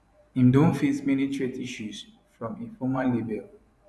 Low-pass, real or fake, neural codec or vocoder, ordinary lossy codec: none; fake; vocoder, 24 kHz, 100 mel bands, Vocos; none